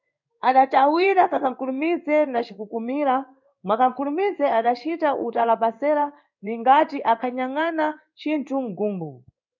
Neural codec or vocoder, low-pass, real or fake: codec, 16 kHz in and 24 kHz out, 1 kbps, XY-Tokenizer; 7.2 kHz; fake